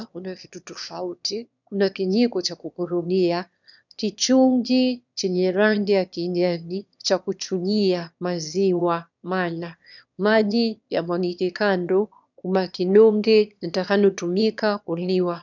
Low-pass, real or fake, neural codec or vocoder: 7.2 kHz; fake; autoencoder, 22.05 kHz, a latent of 192 numbers a frame, VITS, trained on one speaker